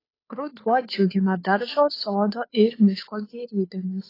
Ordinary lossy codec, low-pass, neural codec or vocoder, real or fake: AAC, 24 kbps; 5.4 kHz; codec, 16 kHz, 2 kbps, FunCodec, trained on Chinese and English, 25 frames a second; fake